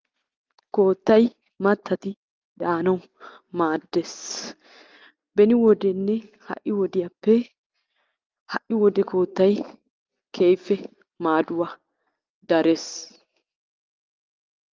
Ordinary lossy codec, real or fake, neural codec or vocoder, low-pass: Opus, 32 kbps; real; none; 7.2 kHz